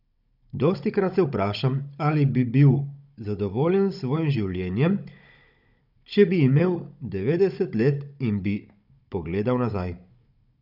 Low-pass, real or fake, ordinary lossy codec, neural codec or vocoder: 5.4 kHz; fake; none; codec, 16 kHz, 16 kbps, FunCodec, trained on Chinese and English, 50 frames a second